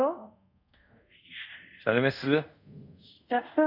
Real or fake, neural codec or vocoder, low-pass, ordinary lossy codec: fake; codec, 24 kHz, 0.5 kbps, DualCodec; 5.4 kHz; none